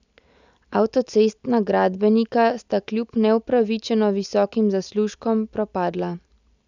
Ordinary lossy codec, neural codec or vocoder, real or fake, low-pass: none; none; real; 7.2 kHz